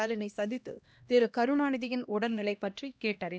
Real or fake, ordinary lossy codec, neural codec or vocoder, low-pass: fake; none; codec, 16 kHz, 1 kbps, X-Codec, HuBERT features, trained on LibriSpeech; none